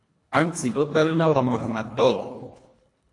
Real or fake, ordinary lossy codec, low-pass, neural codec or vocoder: fake; AAC, 48 kbps; 10.8 kHz; codec, 24 kHz, 1.5 kbps, HILCodec